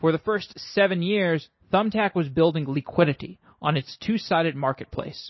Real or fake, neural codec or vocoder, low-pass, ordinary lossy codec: real; none; 7.2 kHz; MP3, 24 kbps